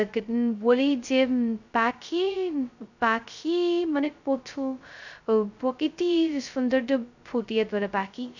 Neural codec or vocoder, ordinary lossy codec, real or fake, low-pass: codec, 16 kHz, 0.2 kbps, FocalCodec; none; fake; 7.2 kHz